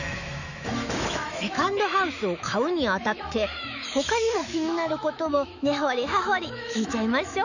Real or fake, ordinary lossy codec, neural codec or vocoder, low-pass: fake; none; autoencoder, 48 kHz, 128 numbers a frame, DAC-VAE, trained on Japanese speech; 7.2 kHz